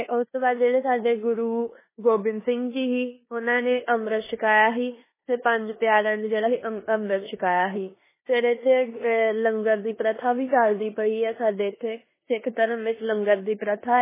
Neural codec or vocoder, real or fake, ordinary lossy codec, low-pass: codec, 16 kHz in and 24 kHz out, 0.9 kbps, LongCat-Audio-Codec, four codebook decoder; fake; MP3, 16 kbps; 3.6 kHz